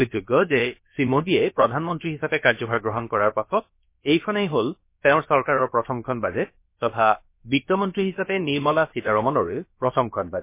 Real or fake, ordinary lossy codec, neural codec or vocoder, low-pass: fake; MP3, 24 kbps; codec, 16 kHz, about 1 kbps, DyCAST, with the encoder's durations; 3.6 kHz